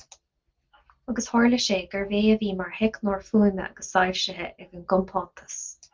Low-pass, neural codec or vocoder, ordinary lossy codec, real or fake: 7.2 kHz; none; Opus, 24 kbps; real